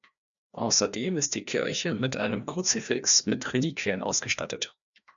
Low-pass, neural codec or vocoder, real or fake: 7.2 kHz; codec, 16 kHz, 1 kbps, FreqCodec, larger model; fake